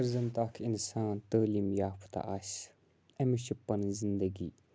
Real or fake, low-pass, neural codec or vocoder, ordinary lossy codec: real; none; none; none